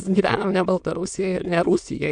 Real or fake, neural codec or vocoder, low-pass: fake; autoencoder, 22.05 kHz, a latent of 192 numbers a frame, VITS, trained on many speakers; 9.9 kHz